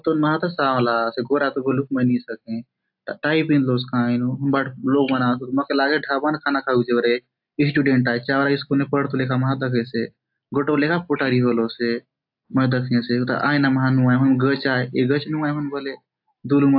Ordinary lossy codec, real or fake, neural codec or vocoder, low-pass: AAC, 48 kbps; real; none; 5.4 kHz